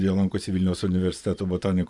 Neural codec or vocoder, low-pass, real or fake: vocoder, 24 kHz, 100 mel bands, Vocos; 10.8 kHz; fake